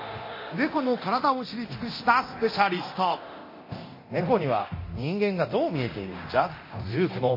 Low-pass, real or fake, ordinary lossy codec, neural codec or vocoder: 5.4 kHz; fake; MP3, 24 kbps; codec, 24 kHz, 0.9 kbps, DualCodec